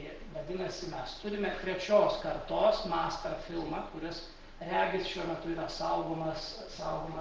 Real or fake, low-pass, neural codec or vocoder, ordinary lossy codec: real; 7.2 kHz; none; Opus, 16 kbps